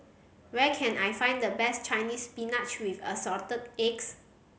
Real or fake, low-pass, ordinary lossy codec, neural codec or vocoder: real; none; none; none